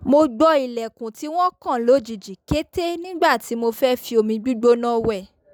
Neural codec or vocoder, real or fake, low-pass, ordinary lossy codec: none; real; none; none